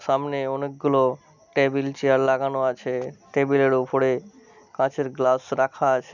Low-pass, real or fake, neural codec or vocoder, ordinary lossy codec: 7.2 kHz; real; none; none